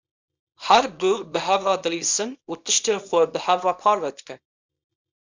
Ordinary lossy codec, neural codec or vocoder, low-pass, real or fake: MP3, 64 kbps; codec, 24 kHz, 0.9 kbps, WavTokenizer, small release; 7.2 kHz; fake